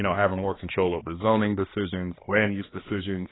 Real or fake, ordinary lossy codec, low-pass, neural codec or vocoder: fake; AAC, 16 kbps; 7.2 kHz; codec, 16 kHz, 2 kbps, X-Codec, HuBERT features, trained on balanced general audio